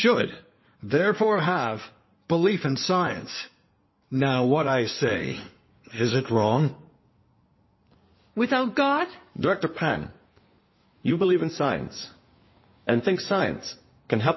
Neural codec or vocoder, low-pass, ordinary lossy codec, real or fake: codec, 16 kHz in and 24 kHz out, 2.2 kbps, FireRedTTS-2 codec; 7.2 kHz; MP3, 24 kbps; fake